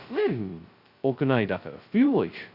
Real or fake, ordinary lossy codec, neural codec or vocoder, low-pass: fake; Opus, 64 kbps; codec, 16 kHz, 0.2 kbps, FocalCodec; 5.4 kHz